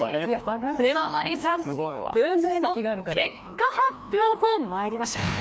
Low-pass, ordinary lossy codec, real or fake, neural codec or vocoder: none; none; fake; codec, 16 kHz, 1 kbps, FreqCodec, larger model